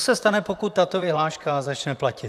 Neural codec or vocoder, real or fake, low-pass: vocoder, 44.1 kHz, 128 mel bands, Pupu-Vocoder; fake; 14.4 kHz